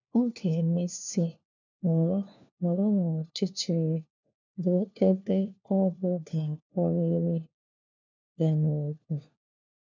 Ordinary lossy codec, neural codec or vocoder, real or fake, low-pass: none; codec, 16 kHz, 1 kbps, FunCodec, trained on LibriTTS, 50 frames a second; fake; 7.2 kHz